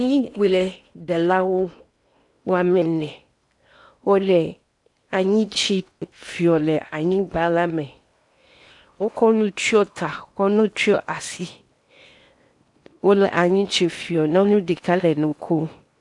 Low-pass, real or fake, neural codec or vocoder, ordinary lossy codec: 10.8 kHz; fake; codec, 16 kHz in and 24 kHz out, 0.8 kbps, FocalCodec, streaming, 65536 codes; AAC, 64 kbps